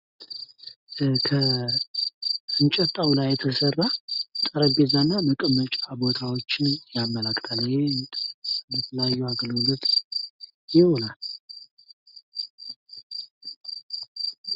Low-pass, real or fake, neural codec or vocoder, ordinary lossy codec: 5.4 kHz; real; none; Opus, 64 kbps